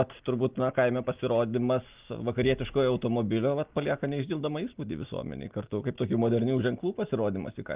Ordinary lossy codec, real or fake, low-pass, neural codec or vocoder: Opus, 32 kbps; real; 3.6 kHz; none